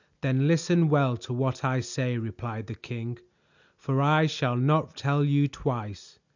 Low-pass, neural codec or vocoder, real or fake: 7.2 kHz; none; real